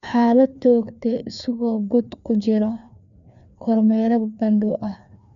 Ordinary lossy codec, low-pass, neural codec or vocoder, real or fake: none; 7.2 kHz; codec, 16 kHz, 2 kbps, FreqCodec, larger model; fake